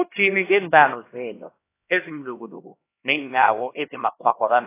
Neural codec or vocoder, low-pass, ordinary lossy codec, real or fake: codec, 16 kHz, 1 kbps, X-Codec, HuBERT features, trained on LibriSpeech; 3.6 kHz; AAC, 24 kbps; fake